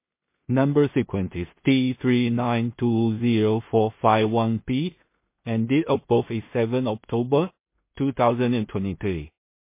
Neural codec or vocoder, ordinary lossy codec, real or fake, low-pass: codec, 16 kHz in and 24 kHz out, 0.4 kbps, LongCat-Audio-Codec, two codebook decoder; MP3, 24 kbps; fake; 3.6 kHz